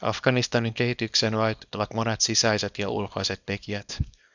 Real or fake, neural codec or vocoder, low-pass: fake; codec, 24 kHz, 0.9 kbps, WavTokenizer, small release; 7.2 kHz